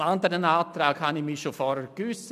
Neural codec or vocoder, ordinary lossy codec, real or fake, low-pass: none; none; real; 14.4 kHz